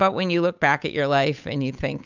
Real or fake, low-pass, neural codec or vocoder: fake; 7.2 kHz; codec, 16 kHz, 8 kbps, FunCodec, trained on Chinese and English, 25 frames a second